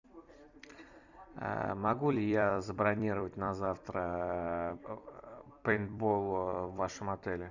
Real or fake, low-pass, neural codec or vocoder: fake; 7.2 kHz; vocoder, 44.1 kHz, 128 mel bands every 256 samples, BigVGAN v2